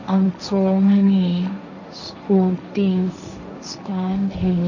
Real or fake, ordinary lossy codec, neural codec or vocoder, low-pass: fake; none; codec, 16 kHz, 1.1 kbps, Voila-Tokenizer; 7.2 kHz